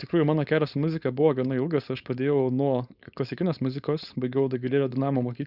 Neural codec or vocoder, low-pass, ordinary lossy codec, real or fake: codec, 16 kHz, 4.8 kbps, FACodec; 5.4 kHz; Opus, 64 kbps; fake